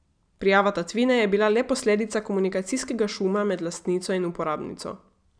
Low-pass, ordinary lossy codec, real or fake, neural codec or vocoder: 9.9 kHz; none; real; none